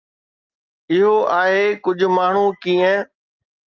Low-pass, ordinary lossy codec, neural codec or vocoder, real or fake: 7.2 kHz; Opus, 24 kbps; none; real